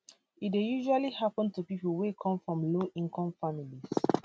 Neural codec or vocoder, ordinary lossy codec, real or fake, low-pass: none; none; real; none